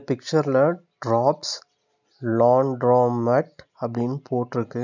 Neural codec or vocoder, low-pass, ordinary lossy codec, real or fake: none; 7.2 kHz; none; real